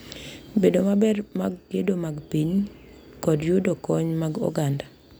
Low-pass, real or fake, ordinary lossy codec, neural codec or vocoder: none; real; none; none